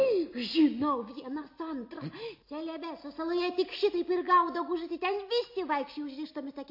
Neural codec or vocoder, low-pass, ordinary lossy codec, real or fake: none; 5.4 kHz; MP3, 32 kbps; real